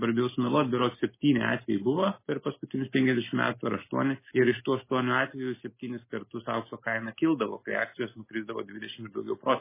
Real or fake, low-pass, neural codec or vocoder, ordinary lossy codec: real; 3.6 kHz; none; MP3, 16 kbps